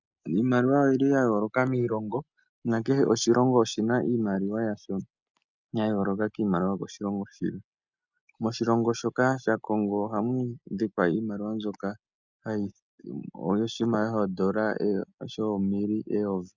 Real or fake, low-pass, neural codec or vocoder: real; 7.2 kHz; none